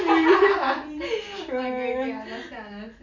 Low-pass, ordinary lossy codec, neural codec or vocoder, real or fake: 7.2 kHz; none; none; real